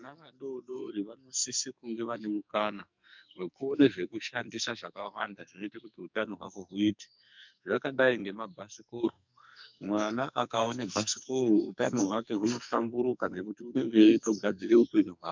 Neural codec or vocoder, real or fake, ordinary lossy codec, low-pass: codec, 44.1 kHz, 2.6 kbps, SNAC; fake; MP3, 64 kbps; 7.2 kHz